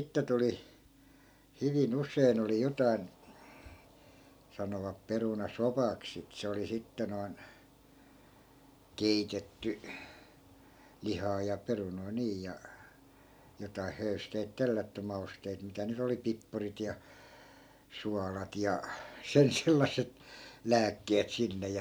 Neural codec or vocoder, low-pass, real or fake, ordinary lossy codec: none; none; real; none